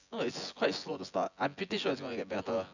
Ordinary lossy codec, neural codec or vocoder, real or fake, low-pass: none; vocoder, 24 kHz, 100 mel bands, Vocos; fake; 7.2 kHz